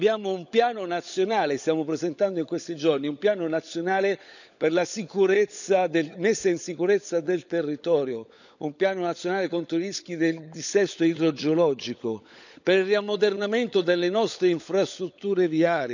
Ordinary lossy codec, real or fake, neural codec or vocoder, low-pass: none; fake; codec, 16 kHz, 16 kbps, FunCodec, trained on LibriTTS, 50 frames a second; 7.2 kHz